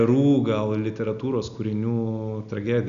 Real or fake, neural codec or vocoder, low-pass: real; none; 7.2 kHz